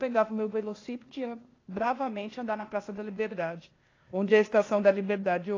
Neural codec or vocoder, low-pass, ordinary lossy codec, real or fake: codec, 16 kHz, 0.8 kbps, ZipCodec; 7.2 kHz; AAC, 32 kbps; fake